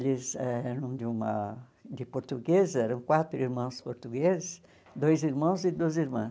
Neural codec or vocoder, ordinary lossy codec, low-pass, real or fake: none; none; none; real